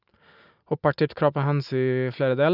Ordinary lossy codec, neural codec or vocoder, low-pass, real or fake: none; none; 5.4 kHz; real